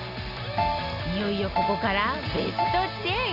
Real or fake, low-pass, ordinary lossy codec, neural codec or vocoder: real; 5.4 kHz; none; none